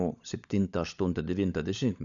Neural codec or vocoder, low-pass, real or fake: codec, 16 kHz, 16 kbps, FunCodec, trained on LibriTTS, 50 frames a second; 7.2 kHz; fake